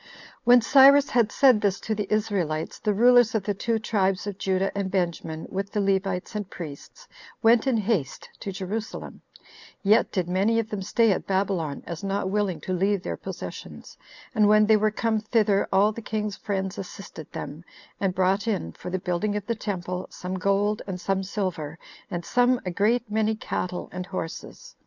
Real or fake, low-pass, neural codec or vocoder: real; 7.2 kHz; none